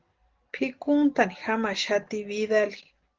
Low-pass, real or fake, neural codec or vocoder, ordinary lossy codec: 7.2 kHz; real; none; Opus, 16 kbps